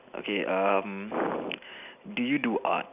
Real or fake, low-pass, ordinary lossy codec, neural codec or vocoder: real; 3.6 kHz; none; none